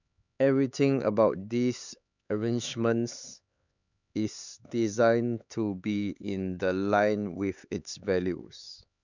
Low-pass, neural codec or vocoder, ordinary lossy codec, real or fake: 7.2 kHz; codec, 16 kHz, 4 kbps, X-Codec, HuBERT features, trained on LibriSpeech; none; fake